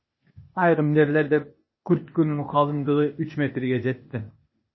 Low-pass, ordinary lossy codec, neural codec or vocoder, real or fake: 7.2 kHz; MP3, 24 kbps; codec, 16 kHz, 0.8 kbps, ZipCodec; fake